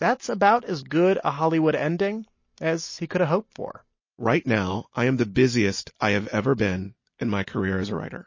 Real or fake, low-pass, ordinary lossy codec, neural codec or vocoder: real; 7.2 kHz; MP3, 32 kbps; none